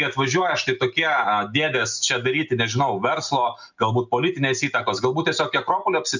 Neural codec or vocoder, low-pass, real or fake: none; 7.2 kHz; real